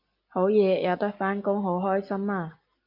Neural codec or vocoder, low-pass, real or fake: none; 5.4 kHz; real